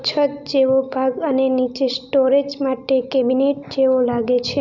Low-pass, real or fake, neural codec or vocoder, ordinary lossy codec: 7.2 kHz; real; none; none